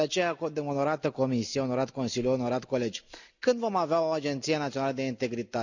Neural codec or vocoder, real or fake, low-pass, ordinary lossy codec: none; real; 7.2 kHz; none